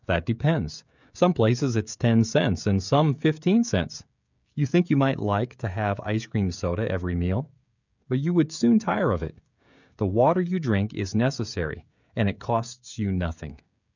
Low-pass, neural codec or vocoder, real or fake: 7.2 kHz; codec, 16 kHz, 16 kbps, FreqCodec, smaller model; fake